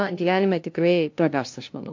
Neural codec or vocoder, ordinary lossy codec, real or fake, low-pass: codec, 16 kHz, 0.5 kbps, FunCodec, trained on LibriTTS, 25 frames a second; MP3, 48 kbps; fake; 7.2 kHz